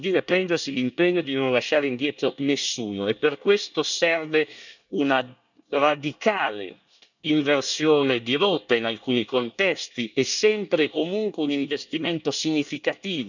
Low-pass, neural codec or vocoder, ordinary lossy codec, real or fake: 7.2 kHz; codec, 24 kHz, 1 kbps, SNAC; none; fake